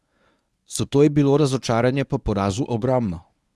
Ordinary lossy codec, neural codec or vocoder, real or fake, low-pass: none; codec, 24 kHz, 0.9 kbps, WavTokenizer, medium speech release version 1; fake; none